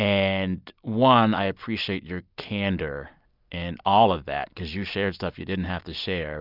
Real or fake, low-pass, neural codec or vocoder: real; 5.4 kHz; none